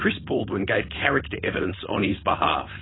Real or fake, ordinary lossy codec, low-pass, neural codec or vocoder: fake; AAC, 16 kbps; 7.2 kHz; codec, 16 kHz, 4.8 kbps, FACodec